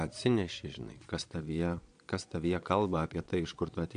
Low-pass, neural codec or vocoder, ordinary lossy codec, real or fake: 9.9 kHz; vocoder, 22.05 kHz, 80 mel bands, Vocos; AAC, 64 kbps; fake